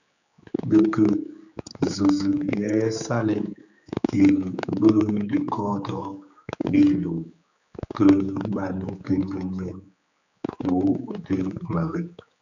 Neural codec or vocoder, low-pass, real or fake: codec, 16 kHz, 4 kbps, X-Codec, HuBERT features, trained on general audio; 7.2 kHz; fake